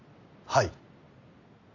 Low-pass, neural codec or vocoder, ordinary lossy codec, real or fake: 7.2 kHz; none; none; real